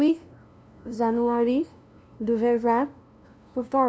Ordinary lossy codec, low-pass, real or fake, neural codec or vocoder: none; none; fake; codec, 16 kHz, 0.5 kbps, FunCodec, trained on LibriTTS, 25 frames a second